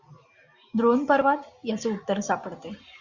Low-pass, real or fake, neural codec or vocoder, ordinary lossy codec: 7.2 kHz; real; none; Opus, 64 kbps